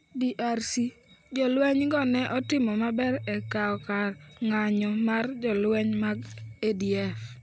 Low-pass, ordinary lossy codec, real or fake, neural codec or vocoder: none; none; real; none